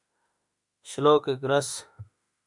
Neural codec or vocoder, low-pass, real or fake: autoencoder, 48 kHz, 32 numbers a frame, DAC-VAE, trained on Japanese speech; 10.8 kHz; fake